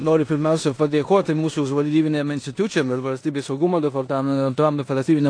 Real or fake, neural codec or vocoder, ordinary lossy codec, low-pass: fake; codec, 16 kHz in and 24 kHz out, 0.9 kbps, LongCat-Audio-Codec, four codebook decoder; AAC, 48 kbps; 9.9 kHz